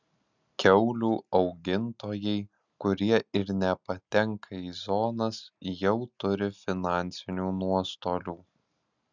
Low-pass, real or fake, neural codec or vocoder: 7.2 kHz; real; none